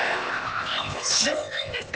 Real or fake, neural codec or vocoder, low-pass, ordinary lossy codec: fake; codec, 16 kHz, 0.8 kbps, ZipCodec; none; none